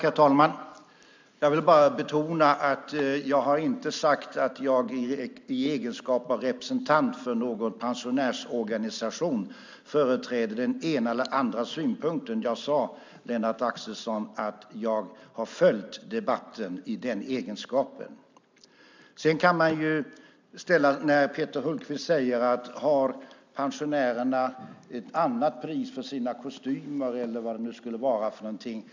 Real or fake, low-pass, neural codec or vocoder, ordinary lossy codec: real; 7.2 kHz; none; MP3, 64 kbps